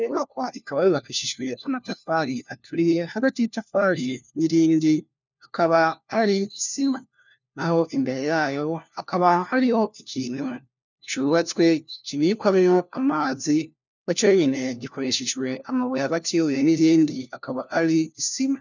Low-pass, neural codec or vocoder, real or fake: 7.2 kHz; codec, 16 kHz, 1 kbps, FunCodec, trained on LibriTTS, 50 frames a second; fake